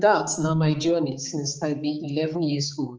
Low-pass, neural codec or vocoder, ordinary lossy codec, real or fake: 7.2 kHz; codec, 16 kHz, 4 kbps, X-Codec, HuBERT features, trained on balanced general audio; Opus, 24 kbps; fake